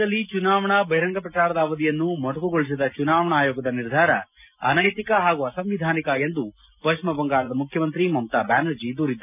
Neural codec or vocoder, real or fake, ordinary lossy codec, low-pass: none; real; none; 3.6 kHz